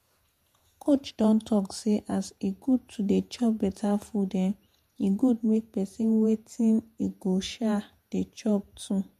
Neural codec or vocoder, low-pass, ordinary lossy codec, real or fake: vocoder, 48 kHz, 128 mel bands, Vocos; 14.4 kHz; MP3, 64 kbps; fake